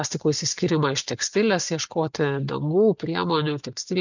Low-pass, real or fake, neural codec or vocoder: 7.2 kHz; real; none